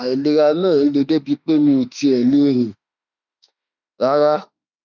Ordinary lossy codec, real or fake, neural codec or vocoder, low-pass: none; fake; autoencoder, 48 kHz, 32 numbers a frame, DAC-VAE, trained on Japanese speech; 7.2 kHz